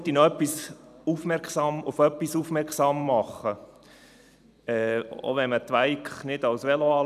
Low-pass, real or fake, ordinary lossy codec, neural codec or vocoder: 14.4 kHz; fake; none; vocoder, 44.1 kHz, 128 mel bands every 256 samples, BigVGAN v2